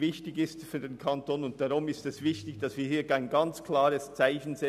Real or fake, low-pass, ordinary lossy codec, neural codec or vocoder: real; 14.4 kHz; none; none